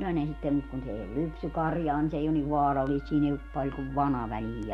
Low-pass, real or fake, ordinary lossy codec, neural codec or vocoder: 19.8 kHz; real; MP3, 64 kbps; none